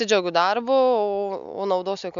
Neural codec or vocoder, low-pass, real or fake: none; 7.2 kHz; real